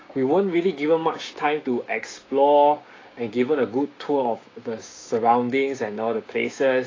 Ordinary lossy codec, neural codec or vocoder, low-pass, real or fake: AAC, 32 kbps; codec, 16 kHz, 6 kbps, DAC; 7.2 kHz; fake